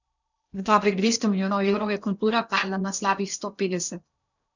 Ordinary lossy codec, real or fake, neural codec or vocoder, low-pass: none; fake; codec, 16 kHz in and 24 kHz out, 0.8 kbps, FocalCodec, streaming, 65536 codes; 7.2 kHz